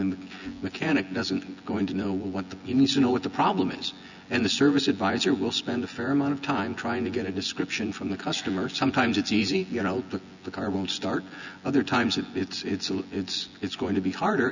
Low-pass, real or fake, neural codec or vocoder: 7.2 kHz; fake; vocoder, 24 kHz, 100 mel bands, Vocos